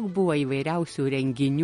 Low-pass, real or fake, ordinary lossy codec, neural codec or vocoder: 10.8 kHz; real; MP3, 48 kbps; none